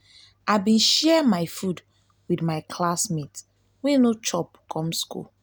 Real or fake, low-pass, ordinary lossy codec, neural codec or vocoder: real; none; none; none